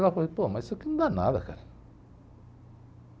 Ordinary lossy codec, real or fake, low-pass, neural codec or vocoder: none; real; none; none